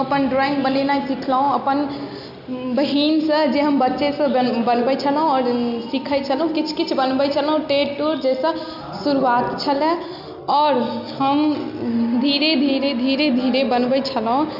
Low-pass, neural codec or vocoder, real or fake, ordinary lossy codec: 5.4 kHz; none; real; none